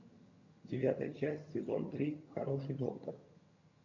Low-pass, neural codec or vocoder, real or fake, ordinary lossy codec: 7.2 kHz; vocoder, 22.05 kHz, 80 mel bands, HiFi-GAN; fake; AAC, 48 kbps